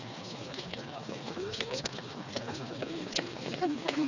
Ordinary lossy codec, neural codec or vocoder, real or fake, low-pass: none; codec, 16 kHz, 2 kbps, FreqCodec, smaller model; fake; 7.2 kHz